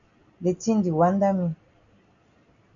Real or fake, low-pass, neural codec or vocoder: real; 7.2 kHz; none